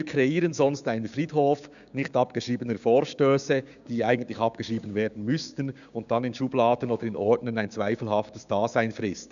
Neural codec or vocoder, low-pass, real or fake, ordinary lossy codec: codec, 16 kHz, 6 kbps, DAC; 7.2 kHz; fake; none